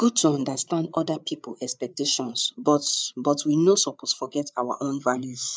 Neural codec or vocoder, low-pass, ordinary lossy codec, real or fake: codec, 16 kHz, 8 kbps, FreqCodec, larger model; none; none; fake